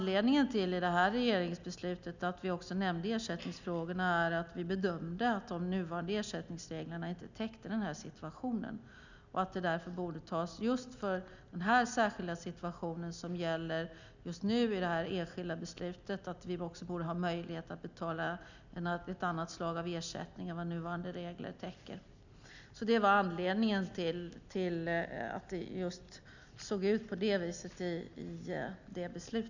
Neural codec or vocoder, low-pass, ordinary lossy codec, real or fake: none; 7.2 kHz; none; real